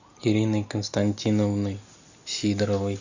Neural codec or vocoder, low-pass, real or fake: none; 7.2 kHz; real